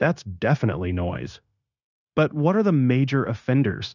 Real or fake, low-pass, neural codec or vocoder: fake; 7.2 kHz; codec, 16 kHz, 0.9 kbps, LongCat-Audio-Codec